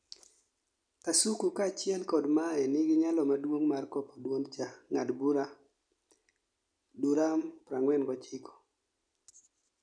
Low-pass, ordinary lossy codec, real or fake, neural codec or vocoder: 9.9 kHz; none; real; none